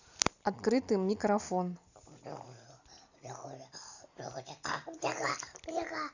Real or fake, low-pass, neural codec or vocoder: real; 7.2 kHz; none